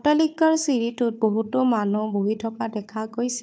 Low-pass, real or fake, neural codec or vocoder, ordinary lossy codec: none; fake; codec, 16 kHz, 4 kbps, FunCodec, trained on Chinese and English, 50 frames a second; none